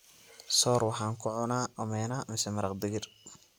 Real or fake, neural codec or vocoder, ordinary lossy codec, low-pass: real; none; none; none